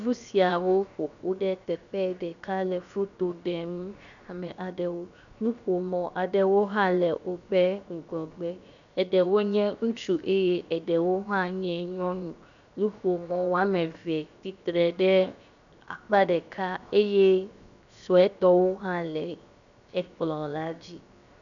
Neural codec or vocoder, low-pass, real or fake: codec, 16 kHz, 0.7 kbps, FocalCodec; 7.2 kHz; fake